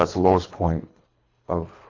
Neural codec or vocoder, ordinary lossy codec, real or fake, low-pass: codec, 24 kHz, 3 kbps, HILCodec; AAC, 32 kbps; fake; 7.2 kHz